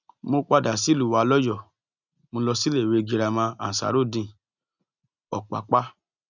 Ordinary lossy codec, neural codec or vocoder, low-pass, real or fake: none; none; 7.2 kHz; real